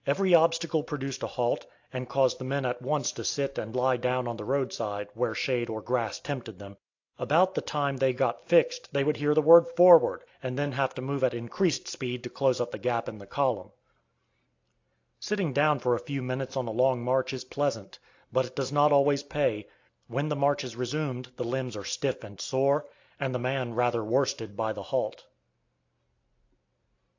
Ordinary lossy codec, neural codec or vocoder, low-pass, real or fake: AAC, 48 kbps; none; 7.2 kHz; real